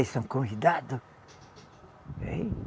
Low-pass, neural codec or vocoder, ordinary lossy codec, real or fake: none; none; none; real